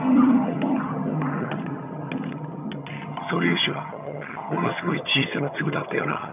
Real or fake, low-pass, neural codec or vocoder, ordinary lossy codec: fake; 3.6 kHz; vocoder, 22.05 kHz, 80 mel bands, HiFi-GAN; none